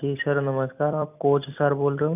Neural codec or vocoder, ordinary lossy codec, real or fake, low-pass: vocoder, 44.1 kHz, 128 mel bands every 256 samples, BigVGAN v2; none; fake; 3.6 kHz